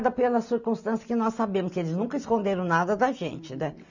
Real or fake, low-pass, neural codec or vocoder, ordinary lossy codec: real; 7.2 kHz; none; none